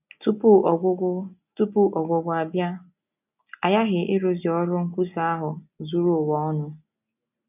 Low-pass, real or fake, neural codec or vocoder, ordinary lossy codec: 3.6 kHz; real; none; none